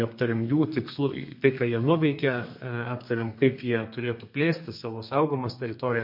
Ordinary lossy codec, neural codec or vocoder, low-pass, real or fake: MP3, 32 kbps; codec, 32 kHz, 1.9 kbps, SNAC; 5.4 kHz; fake